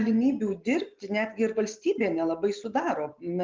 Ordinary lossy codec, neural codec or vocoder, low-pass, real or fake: Opus, 32 kbps; none; 7.2 kHz; real